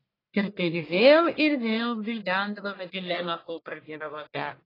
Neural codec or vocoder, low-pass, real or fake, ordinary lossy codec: codec, 44.1 kHz, 1.7 kbps, Pupu-Codec; 5.4 kHz; fake; AAC, 24 kbps